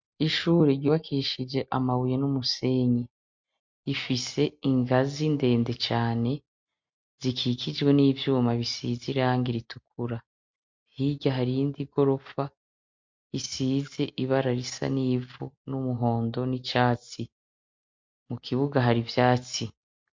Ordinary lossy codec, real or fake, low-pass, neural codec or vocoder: MP3, 48 kbps; real; 7.2 kHz; none